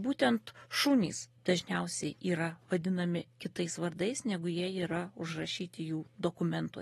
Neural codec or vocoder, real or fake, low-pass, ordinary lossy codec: none; real; 19.8 kHz; AAC, 32 kbps